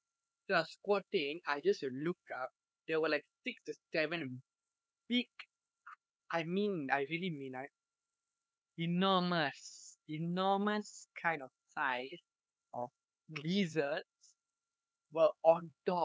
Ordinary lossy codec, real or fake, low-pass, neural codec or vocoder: none; fake; none; codec, 16 kHz, 4 kbps, X-Codec, HuBERT features, trained on LibriSpeech